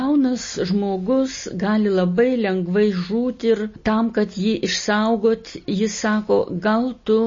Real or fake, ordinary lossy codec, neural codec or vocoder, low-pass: real; MP3, 32 kbps; none; 7.2 kHz